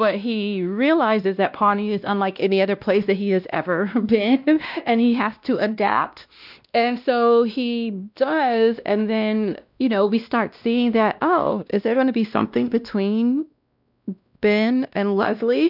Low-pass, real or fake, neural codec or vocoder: 5.4 kHz; fake; codec, 16 kHz, 1 kbps, X-Codec, WavLM features, trained on Multilingual LibriSpeech